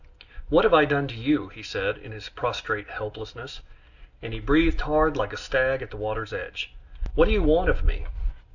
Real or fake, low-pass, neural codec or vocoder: real; 7.2 kHz; none